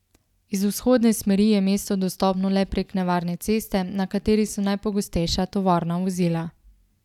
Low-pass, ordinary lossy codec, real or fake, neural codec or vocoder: 19.8 kHz; none; real; none